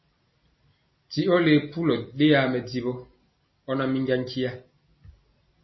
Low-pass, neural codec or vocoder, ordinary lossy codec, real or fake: 7.2 kHz; none; MP3, 24 kbps; real